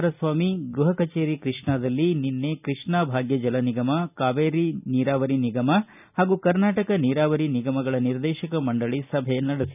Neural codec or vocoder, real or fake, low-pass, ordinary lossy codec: none; real; 3.6 kHz; none